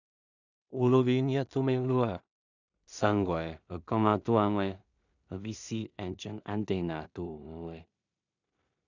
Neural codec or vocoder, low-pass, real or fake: codec, 16 kHz in and 24 kHz out, 0.4 kbps, LongCat-Audio-Codec, two codebook decoder; 7.2 kHz; fake